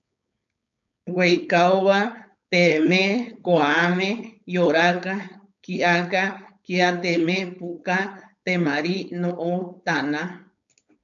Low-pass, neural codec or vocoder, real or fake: 7.2 kHz; codec, 16 kHz, 4.8 kbps, FACodec; fake